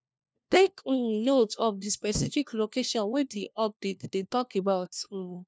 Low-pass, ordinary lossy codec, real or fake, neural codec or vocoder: none; none; fake; codec, 16 kHz, 1 kbps, FunCodec, trained on LibriTTS, 50 frames a second